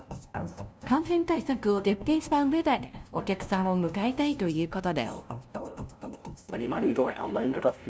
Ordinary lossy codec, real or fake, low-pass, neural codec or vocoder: none; fake; none; codec, 16 kHz, 0.5 kbps, FunCodec, trained on LibriTTS, 25 frames a second